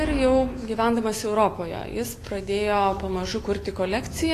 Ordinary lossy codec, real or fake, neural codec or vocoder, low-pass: AAC, 48 kbps; fake; autoencoder, 48 kHz, 128 numbers a frame, DAC-VAE, trained on Japanese speech; 14.4 kHz